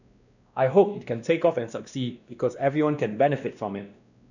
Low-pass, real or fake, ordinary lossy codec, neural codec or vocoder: 7.2 kHz; fake; none; codec, 16 kHz, 1 kbps, X-Codec, WavLM features, trained on Multilingual LibriSpeech